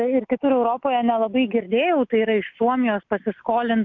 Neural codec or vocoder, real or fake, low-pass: none; real; 7.2 kHz